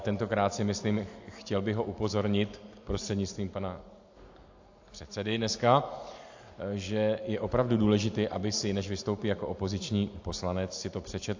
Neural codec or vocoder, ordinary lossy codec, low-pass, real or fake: none; AAC, 48 kbps; 7.2 kHz; real